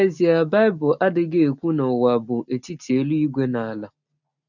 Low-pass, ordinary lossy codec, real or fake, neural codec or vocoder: 7.2 kHz; none; real; none